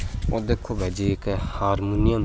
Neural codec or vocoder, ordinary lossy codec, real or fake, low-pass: none; none; real; none